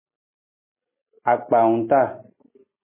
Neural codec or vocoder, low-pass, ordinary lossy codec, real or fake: none; 3.6 kHz; MP3, 16 kbps; real